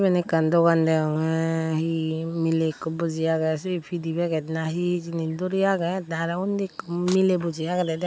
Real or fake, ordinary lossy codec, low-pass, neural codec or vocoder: real; none; none; none